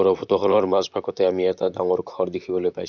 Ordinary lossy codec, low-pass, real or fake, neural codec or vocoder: none; 7.2 kHz; fake; vocoder, 44.1 kHz, 128 mel bands, Pupu-Vocoder